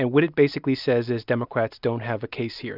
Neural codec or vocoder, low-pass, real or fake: none; 5.4 kHz; real